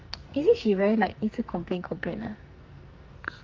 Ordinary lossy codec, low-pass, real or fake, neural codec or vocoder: Opus, 32 kbps; 7.2 kHz; fake; codec, 44.1 kHz, 2.6 kbps, SNAC